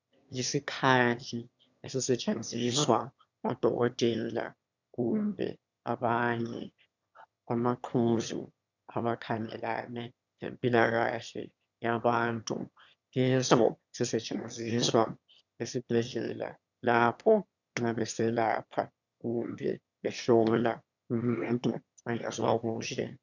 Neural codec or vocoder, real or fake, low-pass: autoencoder, 22.05 kHz, a latent of 192 numbers a frame, VITS, trained on one speaker; fake; 7.2 kHz